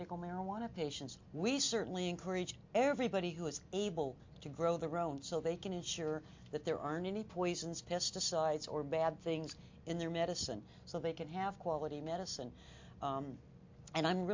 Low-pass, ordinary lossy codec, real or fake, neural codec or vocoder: 7.2 kHz; MP3, 48 kbps; real; none